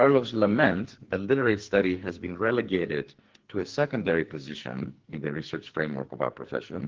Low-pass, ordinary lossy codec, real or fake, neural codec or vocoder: 7.2 kHz; Opus, 16 kbps; fake; codec, 44.1 kHz, 2.6 kbps, SNAC